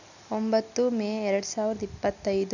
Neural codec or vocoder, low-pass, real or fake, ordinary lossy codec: none; 7.2 kHz; real; none